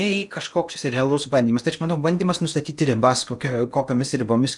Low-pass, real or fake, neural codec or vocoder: 10.8 kHz; fake; codec, 16 kHz in and 24 kHz out, 0.8 kbps, FocalCodec, streaming, 65536 codes